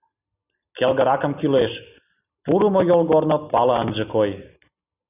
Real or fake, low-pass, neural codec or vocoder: real; 3.6 kHz; none